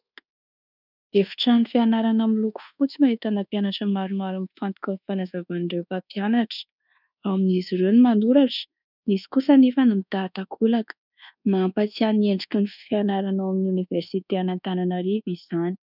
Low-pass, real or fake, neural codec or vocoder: 5.4 kHz; fake; codec, 24 kHz, 1.2 kbps, DualCodec